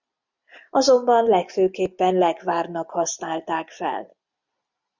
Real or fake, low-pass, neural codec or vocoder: real; 7.2 kHz; none